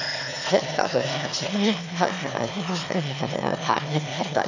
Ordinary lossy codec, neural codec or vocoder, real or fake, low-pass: none; autoencoder, 22.05 kHz, a latent of 192 numbers a frame, VITS, trained on one speaker; fake; 7.2 kHz